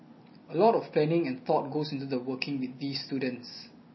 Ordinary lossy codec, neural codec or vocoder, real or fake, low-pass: MP3, 24 kbps; none; real; 7.2 kHz